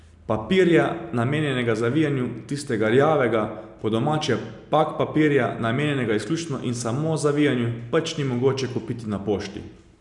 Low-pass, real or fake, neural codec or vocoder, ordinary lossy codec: 10.8 kHz; real; none; none